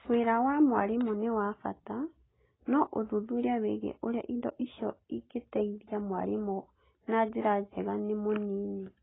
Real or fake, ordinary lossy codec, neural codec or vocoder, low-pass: real; AAC, 16 kbps; none; 7.2 kHz